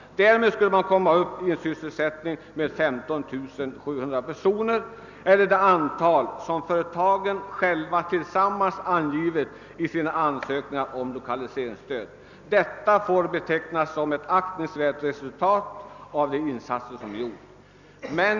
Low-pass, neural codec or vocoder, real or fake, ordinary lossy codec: 7.2 kHz; none; real; none